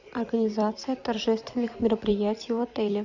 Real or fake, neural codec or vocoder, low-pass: fake; vocoder, 44.1 kHz, 128 mel bands every 256 samples, BigVGAN v2; 7.2 kHz